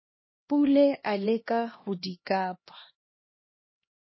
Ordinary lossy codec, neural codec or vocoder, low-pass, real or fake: MP3, 24 kbps; codec, 16 kHz, 1 kbps, X-Codec, HuBERT features, trained on LibriSpeech; 7.2 kHz; fake